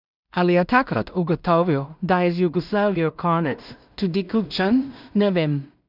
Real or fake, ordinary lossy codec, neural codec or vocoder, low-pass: fake; none; codec, 16 kHz in and 24 kHz out, 0.4 kbps, LongCat-Audio-Codec, two codebook decoder; 5.4 kHz